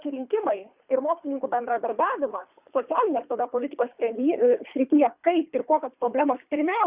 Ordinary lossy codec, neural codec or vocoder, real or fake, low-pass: Opus, 64 kbps; codec, 24 kHz, 3 kbps, HILCodec; fake; 3.6 kHz